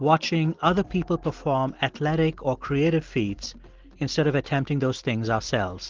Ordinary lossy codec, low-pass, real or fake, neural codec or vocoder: Opus, 16 kbps; 7.2 kHz; real; none